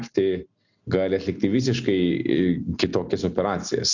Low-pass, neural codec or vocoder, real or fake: 7.2 kHz; none; real